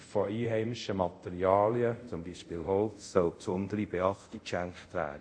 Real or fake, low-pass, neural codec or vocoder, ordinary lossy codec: fake; 9.9 kHz; codec, 24 kHz, 0.5 kbps, DualCodec; none